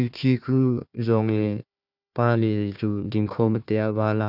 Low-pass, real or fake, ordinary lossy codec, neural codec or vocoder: 5.4 kHz; fake; none; codec, 16 kHz, 1 kbps, FunCodec, trained on Chinese and English, 50 frames a second